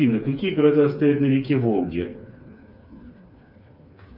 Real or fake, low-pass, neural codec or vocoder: fake; 5.4 kHz; codec, 16 kHz, 4 kbps, FreqCodec, smaller model